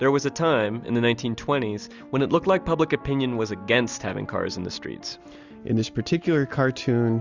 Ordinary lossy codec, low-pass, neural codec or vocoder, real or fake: Opus, 64 kbps; 7.2 kHz; none; real